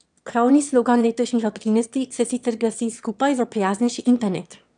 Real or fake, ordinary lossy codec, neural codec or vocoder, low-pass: fake; none; autoencoder, 22.05 kHz, a latent of 192 numbers a frame, VITS, trained on one speaker; 9.9 kHz